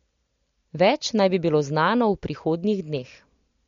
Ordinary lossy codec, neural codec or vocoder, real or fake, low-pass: MP3, 48 kbps; none; real; 7.2 kHz